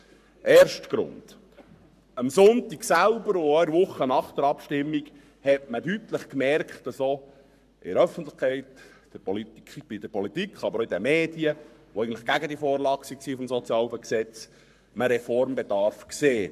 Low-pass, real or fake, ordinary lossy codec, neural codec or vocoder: 14.4 kHz; fake; none; codec, 44.1 kHz, 7.8 kbps, Pupu-Codec